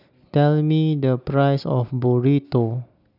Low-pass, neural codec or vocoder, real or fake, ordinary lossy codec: 5.4 kHz; none; real; none